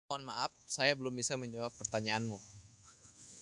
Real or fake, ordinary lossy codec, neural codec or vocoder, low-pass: fake; MP3, 96 kbps; codec, 24 kHz, 1.2 kbps, DualCodec; 10.8 kHz